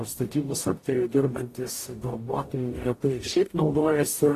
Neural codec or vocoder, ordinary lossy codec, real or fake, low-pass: codec, 44.1 kHz, 0.9 kbps, DAC; AAC, 48 kbps; fake; 14.4 kHz